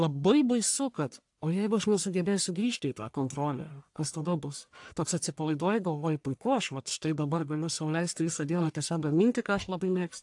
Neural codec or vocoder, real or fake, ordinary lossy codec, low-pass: codec, 44.1 kHz, 1.7 kbps, Pupu-Codec; fake; AAC, 64 kbps; 10.8 kHz